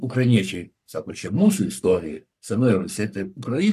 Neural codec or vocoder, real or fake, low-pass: codec, 44.1 kHz, 3.4 kbps, Pupu-Codec; fake; 14.4 kHz